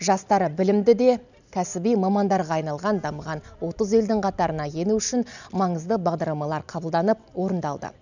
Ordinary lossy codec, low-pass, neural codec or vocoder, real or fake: none; 7.2 kHz; none; real